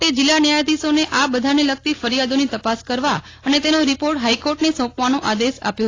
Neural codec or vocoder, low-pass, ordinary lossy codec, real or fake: none; 7.2 kHz; AAC, 32 kbps; real